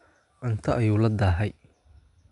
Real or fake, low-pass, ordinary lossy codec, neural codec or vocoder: real; 10.8 kHz; none; none